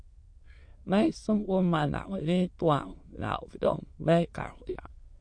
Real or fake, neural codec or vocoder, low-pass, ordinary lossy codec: fake; autoencoder, 22.05 kHz, a latent of 192 numbers a frame, VITS, trained on many speakers; 9.9 kHz; MP3, 48 kbps